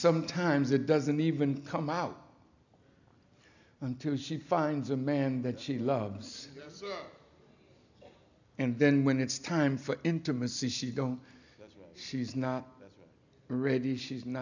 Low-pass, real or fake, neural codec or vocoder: 7.2 kHz; real; none